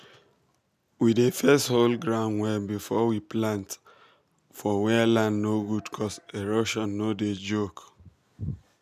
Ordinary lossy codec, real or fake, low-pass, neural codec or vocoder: none; real; 14.4 kHz; none